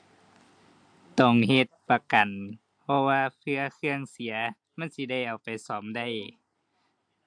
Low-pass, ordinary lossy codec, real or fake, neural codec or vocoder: 9.9 kHz; AAC, 64 kbps; real; none